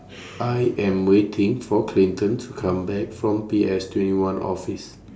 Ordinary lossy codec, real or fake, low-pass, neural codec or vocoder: none; real; none; none